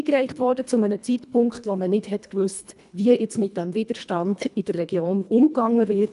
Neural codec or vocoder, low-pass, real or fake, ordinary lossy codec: codec, 24 kHz, 1.5 kbps, HILCodec; 10.8 kHz; fake; none